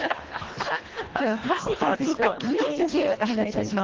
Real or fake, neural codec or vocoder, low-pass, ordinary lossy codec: fake; codec, 24 kHz, 1.5 kbps, HILCodec; 7.2 kHz; Opus, 16 kbps